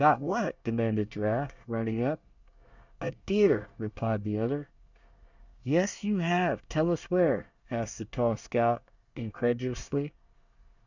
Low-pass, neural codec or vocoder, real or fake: 7.2 kHz; codec, 24 kHz, 1 kbps, SNAC; fake